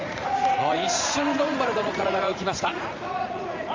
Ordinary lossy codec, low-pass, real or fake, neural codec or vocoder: Opus, 32 kbps; 7.2 kHz; fake; vocoder, 44.1 kHz, 80 mel bands, Vocos